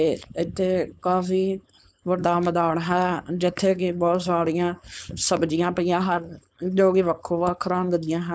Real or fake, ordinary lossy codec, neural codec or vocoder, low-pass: fake; none; codec, 16 kHz, 4.8 kbps, FACodec; none